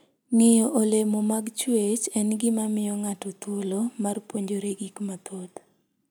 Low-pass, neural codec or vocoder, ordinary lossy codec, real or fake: none; none; none; real